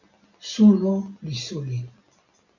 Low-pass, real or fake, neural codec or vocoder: 7.2 kHz; real; none